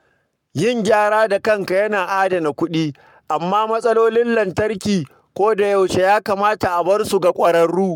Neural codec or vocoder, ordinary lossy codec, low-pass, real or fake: codec, 44.1 kHz, 7.8 kbps, Pupu-Codec; MP3, 96 kbps; 19.8 kHz; fake